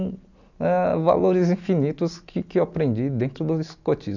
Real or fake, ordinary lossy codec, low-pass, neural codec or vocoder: real; none; 7.2 kHz; none